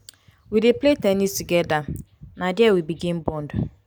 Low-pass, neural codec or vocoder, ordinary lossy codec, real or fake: none; none; none; real